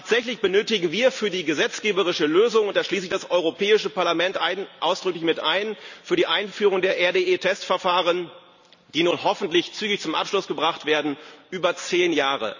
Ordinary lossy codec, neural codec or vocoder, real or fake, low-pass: none; none; real; 7.2 kHz